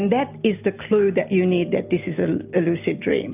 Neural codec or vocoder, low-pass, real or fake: none; 3.6 kHz; real